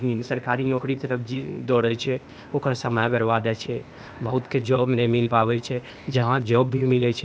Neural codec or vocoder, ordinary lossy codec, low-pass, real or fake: codec, 16 kHz, 0.8 kbps, ZipCodec; none; none; fake